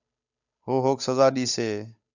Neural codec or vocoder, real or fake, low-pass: codec, 16 kHz, 8 kbps, FunCodec, trained on Chinese and English, 25 frames a second; fake; 7.2 kHz